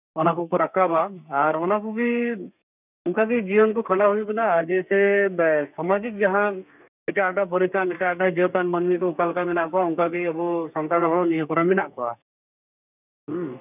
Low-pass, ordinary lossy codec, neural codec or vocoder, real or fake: 3.6 kHz; none; codec, 32 kHz, 1.9 kbps, SNAC; fake